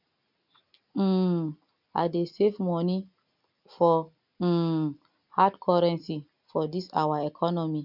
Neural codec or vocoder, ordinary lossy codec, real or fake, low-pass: none; none; real; 5.4 kHz